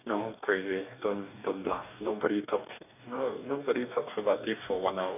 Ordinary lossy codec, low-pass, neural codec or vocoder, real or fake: none; 3.6 kHz; codec, 44.1 kHz, 2.6 kbps, DAC; fake